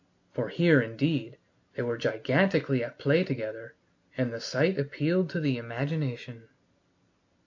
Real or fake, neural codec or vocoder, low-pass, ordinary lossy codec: real; none; 7.2 kHz; AAC, 48 kbps